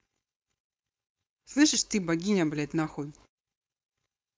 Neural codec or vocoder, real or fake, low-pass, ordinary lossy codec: codec, 16 kHz, 4.8 kbps, FACodec; fake; none; none